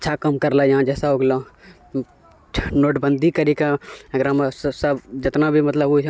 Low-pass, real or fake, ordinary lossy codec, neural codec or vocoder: none; real; none; none